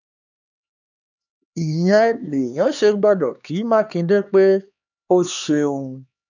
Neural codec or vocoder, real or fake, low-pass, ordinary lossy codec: codec, 16 kHz, 2 kbps, X-Codec, HuBERT features, trained on LibriSpeech; fake; 7.2 kHz; none